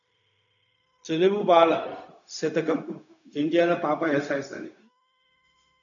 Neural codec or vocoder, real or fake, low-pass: codec, 16 kHz, 0.4 kbps, LongCat-Audio-Codec; fake; 7.2 kHz